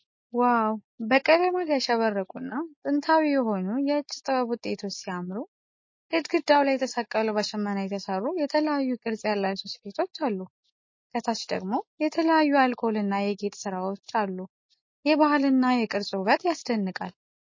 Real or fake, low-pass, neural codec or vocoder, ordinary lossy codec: real; 7.2 kHz; none; MP3, 32 kbps